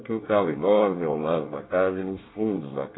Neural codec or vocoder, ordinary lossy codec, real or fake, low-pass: codec, 24 kHz, 1 kbps, SNAC; AAC, 16 kbps; fake; 7.2 kHz